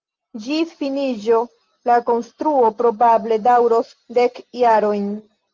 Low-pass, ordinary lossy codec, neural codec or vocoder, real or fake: 7.2 kHz; Opus, 24 kbps; none; real